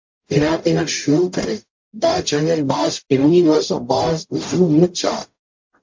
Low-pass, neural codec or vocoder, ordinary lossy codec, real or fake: 7.2 kHz; codec, 44.1 kHz, 0.9 kbps, DAC; MP3, 48 kbps; fake